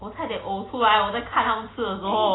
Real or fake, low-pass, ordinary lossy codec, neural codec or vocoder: real; 7.2 kHz; AAC, 16 kbps; none